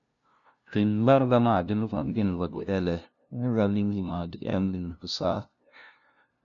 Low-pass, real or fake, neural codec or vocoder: 7.2 kHz; fake; codec, 16 kHz, 0.5 kbps, FunCodec, trained on LibriTTS, 25 frames a second